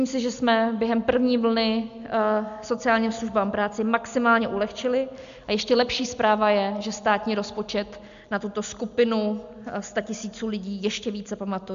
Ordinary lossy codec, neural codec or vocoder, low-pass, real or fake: MP3, 64 kbps; none; 7.2 kHz; real